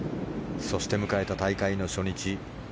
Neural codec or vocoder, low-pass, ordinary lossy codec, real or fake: none; none; none; real